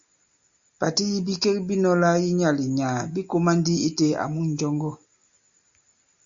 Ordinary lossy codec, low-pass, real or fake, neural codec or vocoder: Opus, 64 kbps; 7.2 kHz; real; none